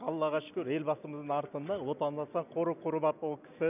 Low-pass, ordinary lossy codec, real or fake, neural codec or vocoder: 3.6 kHz; none; real; none